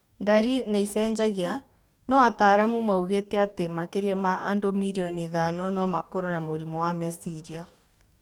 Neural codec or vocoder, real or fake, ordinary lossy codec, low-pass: codec, 44.1 kHz, 2.6 kbps, DAC; fake; none; 19.8 kHz